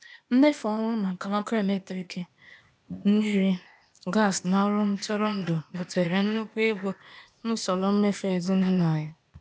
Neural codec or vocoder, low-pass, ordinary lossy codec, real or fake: codec, 16 kHz, 0.8 kbps, ZipCodec; none; none; fake